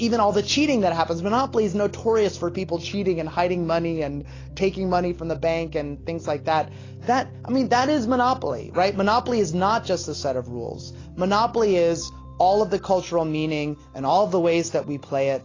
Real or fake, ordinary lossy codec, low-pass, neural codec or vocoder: real; AAC, 32 kbps; 7.2 kHz; none